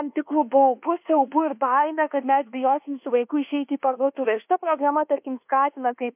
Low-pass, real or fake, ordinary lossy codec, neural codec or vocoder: 3.6 kHz; fake; MP3, 32 kbps; codec, 24 kHz, 1.2 kbps, DualCodec